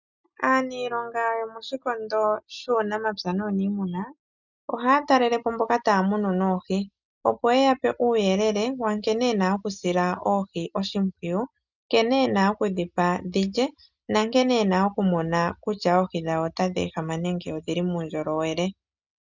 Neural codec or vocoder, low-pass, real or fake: none; 7.2 kHz; real